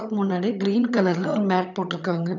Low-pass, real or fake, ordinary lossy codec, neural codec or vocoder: 7.2 kHz; fake; none; vocoder, 22.05 kHz, 80 mel bands, HiFi-GAN